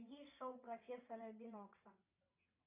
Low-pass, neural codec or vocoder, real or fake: 3.6 kHz; vocoder, 22.05 kHz, 80 mel bands, WaveNeXt; fake